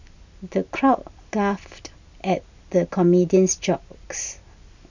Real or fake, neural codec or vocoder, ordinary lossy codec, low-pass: real; none; none; 7.2 kHz